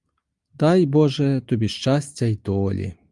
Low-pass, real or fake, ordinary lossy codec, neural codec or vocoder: 10.8 kHz; real; Opus, 32 kbps; none